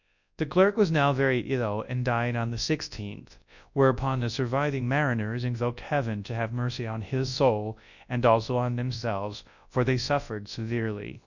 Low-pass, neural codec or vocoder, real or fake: 7.2 kHz; codec, 24 kHz, 0.9 kbps, WavTokenizer, large speech release; fake